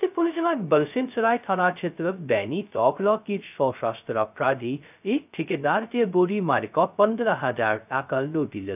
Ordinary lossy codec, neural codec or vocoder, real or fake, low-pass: none; codec, 16 kHz, 0.2 kbps, FocalCodec; fake; 3.6 kHz